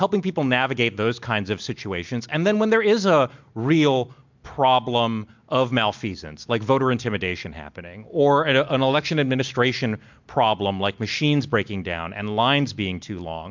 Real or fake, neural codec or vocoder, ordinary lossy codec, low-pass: real; none; MP3, 64 kbps; 7.2 kHz